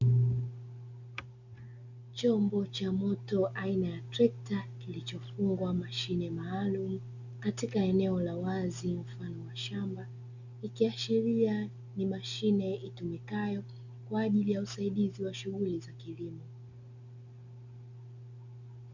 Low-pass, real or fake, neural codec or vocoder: 7.2 kHz; real; none